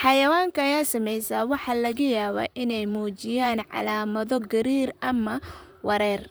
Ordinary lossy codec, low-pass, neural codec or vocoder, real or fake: none; none; vocoder, 44.1 kHz, 128 mel bands, Pupu-Vocoder; fake